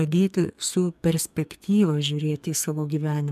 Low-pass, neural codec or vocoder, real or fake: 14.4 kHz; codec, 44.1 kHz, 2.6 kbps, SNAC; fake